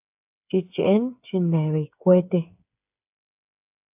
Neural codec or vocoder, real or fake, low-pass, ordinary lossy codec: codec, 16 kHz, 8 kbps, FreqCodec, smaller model; fake; 3.6 kHz; AAC, 32 kbps